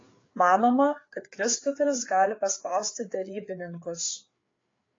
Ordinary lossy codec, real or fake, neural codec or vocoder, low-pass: AAC, 32 kbps; fake; codec, 16 kHz, 4 kbps, FreqCodec, larger model; 7.2 kHz